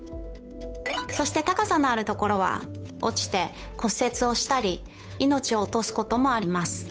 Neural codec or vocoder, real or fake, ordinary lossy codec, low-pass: codec, 16 kHz, 8 kbps, FunCodec, trained on Chinese and English, 25 frames a second; fake; none; none